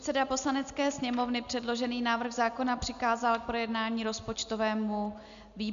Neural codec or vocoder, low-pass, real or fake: none; 7.2 kHz; real